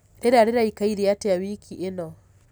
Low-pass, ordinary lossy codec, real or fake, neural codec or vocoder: none; none; real; none